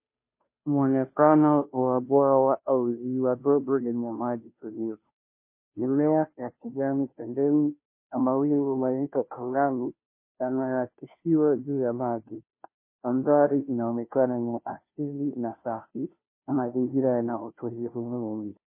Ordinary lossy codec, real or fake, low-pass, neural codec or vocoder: MP3, 24 kbps; fake; 3.6 kHz; codec, 16 kHz, 0.5 kbps, FunCodec, trained on Chinese and English, 25 frames a second